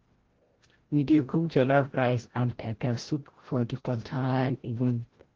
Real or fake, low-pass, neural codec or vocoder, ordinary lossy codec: fake; 7.2 kHz; codec, 16 kHz, 0.5 kbps, FreqCodec, larger model; Opus, 16 kbps